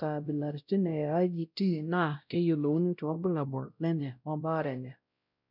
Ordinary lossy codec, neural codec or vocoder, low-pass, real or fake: none; codec, 16 kHz, 0.5 kbps, X-Codec, WavLM features, trained on Multilingual LibriSpeech; 5.4 kHz; fake